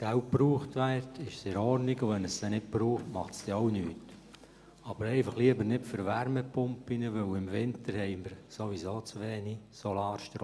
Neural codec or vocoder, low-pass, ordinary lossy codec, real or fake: none; none; none; real